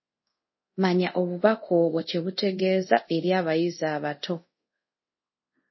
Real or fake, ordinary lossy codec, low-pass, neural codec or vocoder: fake; MP3, 24 kbps; 7.2 kHz; codec, 24 kHz, 0.5 kbps, DualCodec